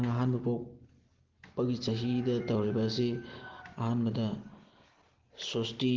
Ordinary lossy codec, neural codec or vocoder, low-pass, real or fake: Opus, 16 kbps; none; 7.2 kHz; real